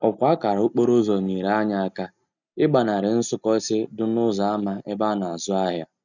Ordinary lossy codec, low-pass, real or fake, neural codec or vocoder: none; 7.2 kHz; real; none